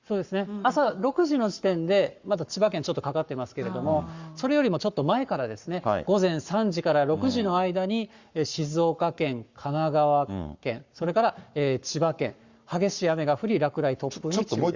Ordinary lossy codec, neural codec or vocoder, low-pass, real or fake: Opus, 64 kbps; codec, 44.1 kHz, 7.8 kbps, Pupu-Codec; 7.2 kHz; fake